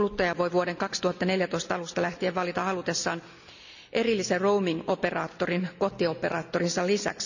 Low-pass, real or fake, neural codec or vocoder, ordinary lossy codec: 7.2 kHz; real; none; none